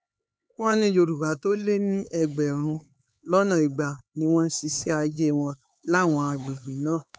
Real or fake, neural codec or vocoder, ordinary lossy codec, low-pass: fake; codec, 16 kHz, 4 kbps, X-Codec, HuBERT features, trained on LibriSpeech; none; none